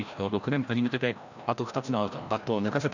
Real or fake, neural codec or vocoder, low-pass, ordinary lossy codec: fake; codec, 16 kHz, 1 kbps, FreqCodec, larger model; 7.2 kHz; none